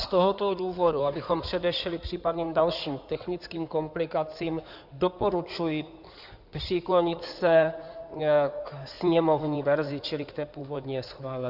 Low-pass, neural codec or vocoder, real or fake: 5.4 kHz; codec, 16 kHz in and 24 kHz out, 2.2 kbps, FireRedTTS-2 codec; fake